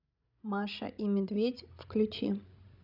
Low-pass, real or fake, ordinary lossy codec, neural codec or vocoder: 5.4 kHz; fake; none; codec, 16 kHz, 16 kbps, FreqCodec, larger model